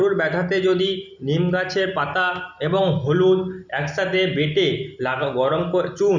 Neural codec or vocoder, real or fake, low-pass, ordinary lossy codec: none; real; 7.2 kHz; none